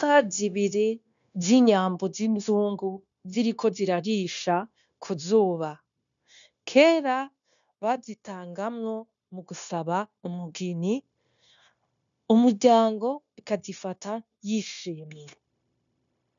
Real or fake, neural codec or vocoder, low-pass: fake; codec, 16 kHz, 0.9 kbps, LongCat-Audio-Codec; 7.2 kHz